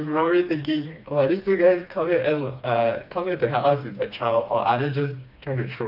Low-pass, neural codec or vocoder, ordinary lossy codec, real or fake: 5.4 kHz; codec, 16 kHz, 2 kbps, FreqCodec, smaller model; AAC, 48 kbps; fake